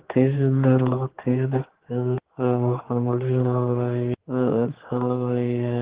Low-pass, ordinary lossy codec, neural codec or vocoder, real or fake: 3.6 kHz; Opus, 16 kbps; codec, 16 kHz, 4 kbps, X-Codec, HuBERT features, trained on general audio; fake